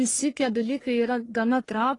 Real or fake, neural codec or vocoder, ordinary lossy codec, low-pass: fake; codec, 44.1 kHz, 1.7 kbps, Pupu-Codec; AAC, 32 kbps; 10.8 kHz